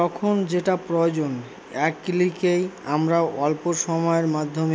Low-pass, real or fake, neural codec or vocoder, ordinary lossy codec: none; real; none; none